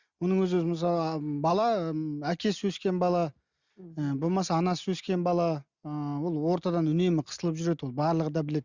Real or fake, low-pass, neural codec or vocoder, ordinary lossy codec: real; 7.2 kHz; none; Opus, 64 kbps